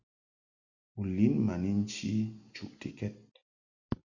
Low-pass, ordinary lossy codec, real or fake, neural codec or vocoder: 7.2 kHz; Opus, 64 kbps; real; none